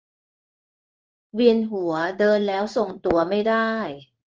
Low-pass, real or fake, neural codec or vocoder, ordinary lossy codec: 7.2 kHz; fake; codec, 16 kHz in and 24 kHz out, 1 kbps, XY-Tokenizer; Opus, 24 kbps